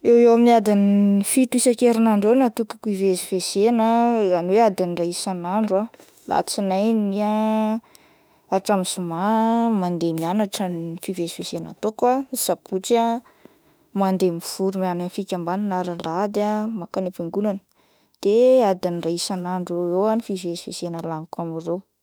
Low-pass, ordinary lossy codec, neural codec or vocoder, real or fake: none; none; autoencoder, 48 kHz, 32 numbers a frame, DAC-VAE, trained on Japanese speech; fake